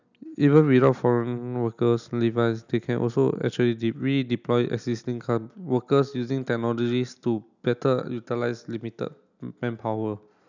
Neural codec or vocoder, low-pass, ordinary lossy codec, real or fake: none; 7.2 kHz; none; real